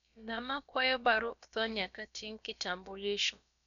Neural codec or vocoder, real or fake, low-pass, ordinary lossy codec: codec, 16 kHz, about 1 kbps, DyCAST, with the encoder's durations; fake; 7.2 kHz; none